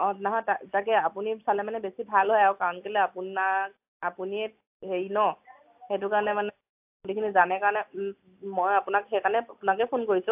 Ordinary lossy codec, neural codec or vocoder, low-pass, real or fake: AAC, 32 kbps; none; 3.6 kHz; real